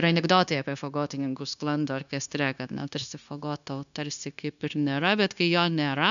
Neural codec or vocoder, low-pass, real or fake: codec, 16 kHz, 0.9 kbps, LongCat-Audio-Codec; 7.2 kHz; fake